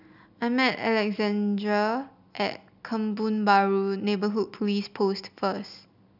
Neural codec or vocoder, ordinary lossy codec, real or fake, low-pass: none; none; real; 5.4 kHz